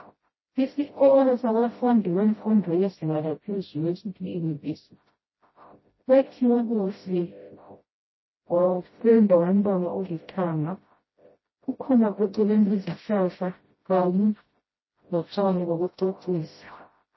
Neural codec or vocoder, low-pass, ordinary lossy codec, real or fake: codec, 16 kHz, 0.5 kbps, FreqCodec, smaller model; 7.2 kHz; MP3, 24 kbps; fake